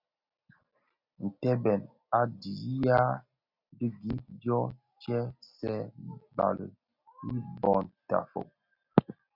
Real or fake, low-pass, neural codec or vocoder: real; 5.4 kHz; none